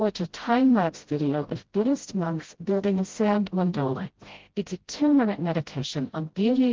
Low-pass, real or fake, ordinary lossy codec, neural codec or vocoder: 7.2 kHz; fake; Opus, 16 kbps; codec, 16 kHz, 0.5 kbps, FreqCodec, smaller model